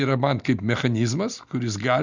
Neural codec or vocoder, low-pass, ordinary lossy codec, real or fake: none; 7.2 kHz; Opus, 64 kbps; real